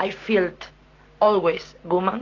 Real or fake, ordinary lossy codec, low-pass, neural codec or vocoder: real; AAC, 32 kbps; 7.2 kHz; none